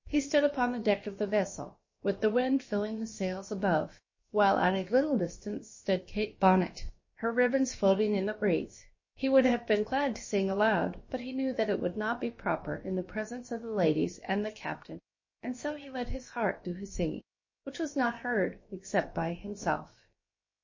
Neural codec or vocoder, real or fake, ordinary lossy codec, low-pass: codec, 16 kHz, about 1 kbps, DyCAST, with the encoder's durations; fake; MP3, 32 kbps; 7.2 kHz